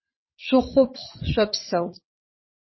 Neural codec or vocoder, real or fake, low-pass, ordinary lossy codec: vocoder, 22.05 kHz, 80 mel bands, WaveNeXt; fake; 7.2 kHz; MP3, 24 kbps